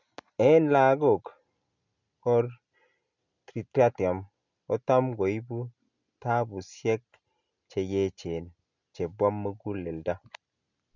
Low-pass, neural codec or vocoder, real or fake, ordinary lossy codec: 7.2 kHz; none; real; none